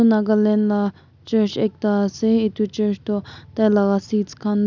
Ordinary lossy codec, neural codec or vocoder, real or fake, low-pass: none; none; real; 7.2 kHz